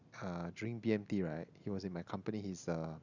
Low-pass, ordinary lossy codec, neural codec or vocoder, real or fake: 7.2 kHz; none; none; real